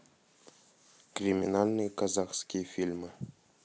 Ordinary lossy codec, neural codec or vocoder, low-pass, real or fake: none; none; none; real